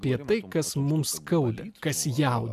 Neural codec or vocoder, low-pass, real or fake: none; 14.4 kHz; real